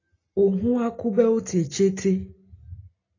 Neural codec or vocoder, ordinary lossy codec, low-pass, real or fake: none; AAC, 32 kbps; 7.2 kHz; real